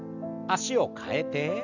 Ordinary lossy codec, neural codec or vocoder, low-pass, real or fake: none; none; 7.2 kHz; real